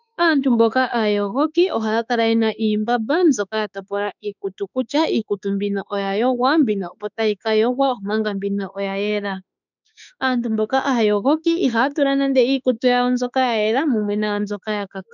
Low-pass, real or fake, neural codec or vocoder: 7.2 kHz; fake; autoencoder, 48 kHz, 32 numbers a frame, DAC-VAE, trained on Japanese speech